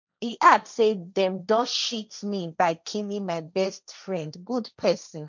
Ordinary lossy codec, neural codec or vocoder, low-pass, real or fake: none; codec, 16 kHz, 1.1 kbps, Voila-Tokenizer; 7.2 kHz; fake